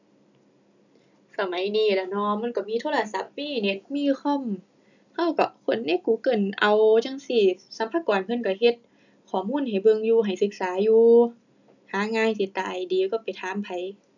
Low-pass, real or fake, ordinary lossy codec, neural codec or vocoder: 7.2 kHz; real; none; none